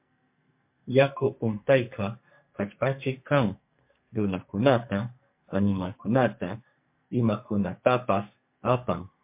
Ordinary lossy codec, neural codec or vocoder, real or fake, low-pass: MP3, 32 kbps; codec, 44.1 kHz, 2.6 kbps, SNAC; fake; 3.6 kHz